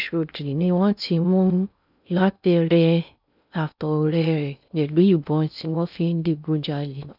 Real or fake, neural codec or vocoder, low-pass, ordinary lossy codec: fake; codec, 16 kHz in and 24 kHz out, 0.6 kbps, FocalCodec, streaming, 2048 codes; 5.4 kHz; none